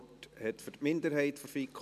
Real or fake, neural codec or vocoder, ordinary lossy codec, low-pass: real; none; MP3, 96 kbps; 14.4 kHz